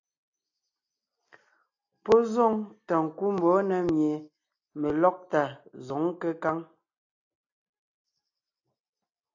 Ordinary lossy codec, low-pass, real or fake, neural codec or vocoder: AAC, 48 kbps; 7.2 kHz; real; none